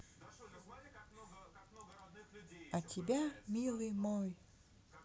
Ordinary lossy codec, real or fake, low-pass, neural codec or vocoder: none; real; none; none